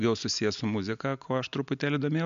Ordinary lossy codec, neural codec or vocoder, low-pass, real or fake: MP3, 64 kbps; none; 7.2 kHz; real